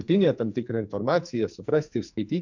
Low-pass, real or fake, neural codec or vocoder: 7.2 kHz; fake; codec, 44.1 kHz, 2.6 kbps, SNAC